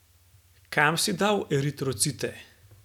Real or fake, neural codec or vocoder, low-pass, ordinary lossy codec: real; none; 19.8 kHz; none